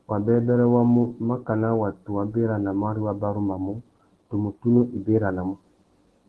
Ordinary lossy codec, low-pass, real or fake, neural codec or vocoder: Opus, 16 kbps; 10.8 kHz; real; none